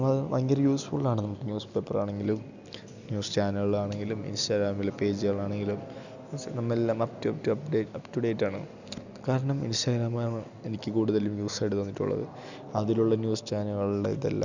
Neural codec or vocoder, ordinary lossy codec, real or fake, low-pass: none; none; real; 7.2 kHz